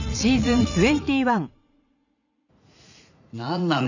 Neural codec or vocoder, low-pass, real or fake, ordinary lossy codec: vocoder, 44.1 kHz, 80 mel bands, Vocos; 7.2 kHz; fake; none